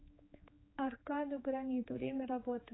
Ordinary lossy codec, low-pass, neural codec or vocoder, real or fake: AAC, 16 kbps; 7.2 kHz; codec, 16 kHz, 4 kbps, X-Codec, HuBERT features, trained on general audio; fake